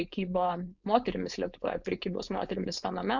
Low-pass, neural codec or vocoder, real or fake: 7.2 kHz; codec, 16 kHz, 4.8 kbps, FACodec; fake